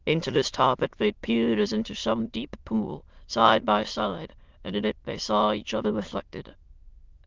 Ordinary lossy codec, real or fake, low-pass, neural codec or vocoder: Opus, 24 kbps; fake; 7.2 kHz; autoencoder, 22.05 kHz, a latent of 192 numbers a frame, VITS, trained on many speakers